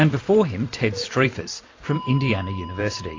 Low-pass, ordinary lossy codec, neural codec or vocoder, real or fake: 7.2 kHz; AAC, 32 kbps; vocoder, 44.1 kHz, 128 mel bands every 256 samples, BigVGAN v2; fake